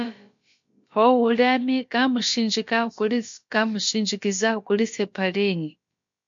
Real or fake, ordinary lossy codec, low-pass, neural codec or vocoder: fake; MP3, 48 kbps; 7.2 kHz; codec, 16 kHz, about 1 kbps, DyCAST, with the encoder's durations